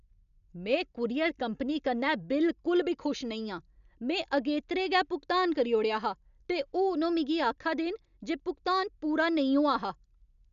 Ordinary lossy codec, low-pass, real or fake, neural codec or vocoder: none; 7.2 kHz; real; none